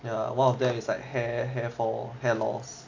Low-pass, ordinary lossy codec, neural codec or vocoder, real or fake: 7.2 kHz; AAC, 48 kbps; none; real